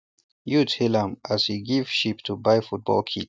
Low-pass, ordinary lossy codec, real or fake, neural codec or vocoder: none; none; real; none